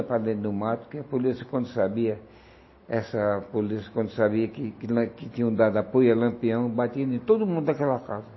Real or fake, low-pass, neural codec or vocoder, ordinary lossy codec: real; 7.2 kHz; none; MP3, 24 kbps